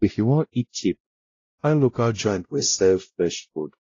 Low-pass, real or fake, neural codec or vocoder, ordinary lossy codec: 7.2 kHz; fake; codec, 16 kHz, 0.5 kbps, X-Codec, WavLM features, trained on Multilingual LibriSpeech; AAC, 32 kbps